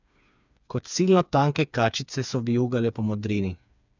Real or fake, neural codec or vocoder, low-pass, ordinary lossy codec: fake; codec, 16 kHz, 4 kbps, FreqCodec, smaller model; 7.2 kHz; none